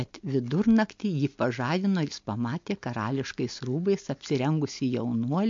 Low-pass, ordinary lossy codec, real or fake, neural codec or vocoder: 7.2 kHz; MP3, 48 kbps; real; none